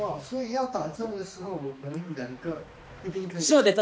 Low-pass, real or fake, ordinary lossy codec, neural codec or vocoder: none; fake; none; codec, 16 kHz, 2 kbps, X-Codec, HuBERT features, trained on balanced general audio